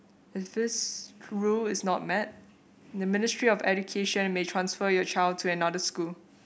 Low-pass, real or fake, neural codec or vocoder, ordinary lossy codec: none; real; none; none